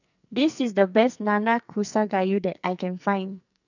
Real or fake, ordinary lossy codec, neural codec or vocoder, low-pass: fake; none; codec, 44.1 kHz, 2.6 kbps, SNAC; 7.2 kHz